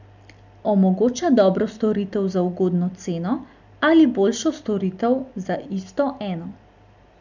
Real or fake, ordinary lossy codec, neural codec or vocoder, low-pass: real; none; none; 7.2 kHz